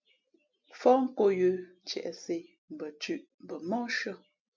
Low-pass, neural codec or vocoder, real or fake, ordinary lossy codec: 7.2 kHz; none; real; MP3, 64 kbps